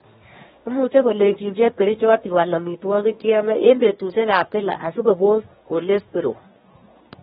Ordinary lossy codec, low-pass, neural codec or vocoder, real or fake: AAC, 16 kbps; 10.8 kHz; codec, 24 kHz, 1 kbps, SNAC; fake